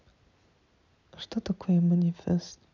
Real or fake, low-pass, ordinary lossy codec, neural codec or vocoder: real; 7.2 kHz; none; none